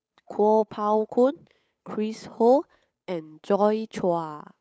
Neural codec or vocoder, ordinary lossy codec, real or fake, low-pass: codec, 16 kHz, 8 kbps, FunCodec, trained on Chinese and English, 25 frames a second; none; fake; none